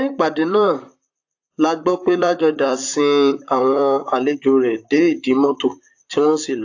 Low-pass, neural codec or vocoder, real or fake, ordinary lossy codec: 7.2 kHz; vocoder, 44.1 kHz, 128 mel bands, Pupu-Vocoder; fake; AAC, 48 kbps